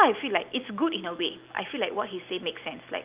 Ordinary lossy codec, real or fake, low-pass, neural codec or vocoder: Opus, 24 kbps; real; 3.6 kHz; none